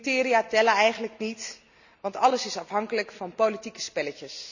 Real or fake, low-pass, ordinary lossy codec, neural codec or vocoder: real; 7.2 kHz; none; none